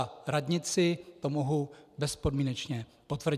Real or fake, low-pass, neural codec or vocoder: fake; 14.4 kHz; vocoder, 44.1 kHz, 128 mel bands every 256 samples, BigVGAN v2